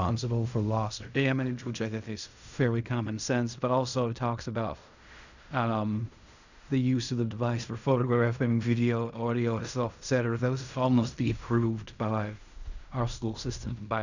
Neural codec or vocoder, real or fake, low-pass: codec, 16 kHz in and 24 kHz out, 0.4 kbps, LongCat-Audio-Codec, fine tuned four codebook decoder; fake; 7.2 kHz